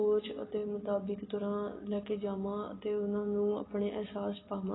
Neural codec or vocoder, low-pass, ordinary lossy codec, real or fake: none; 7.2 kHz; AAC, 16 kbps; real